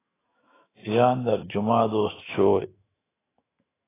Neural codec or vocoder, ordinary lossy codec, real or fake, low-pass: autoencoder, 48 kHz, 128 numbers a frame, DAC-VAE, trained on Japanese speech; AAC, 16 kbps; fake; 3.6 kHz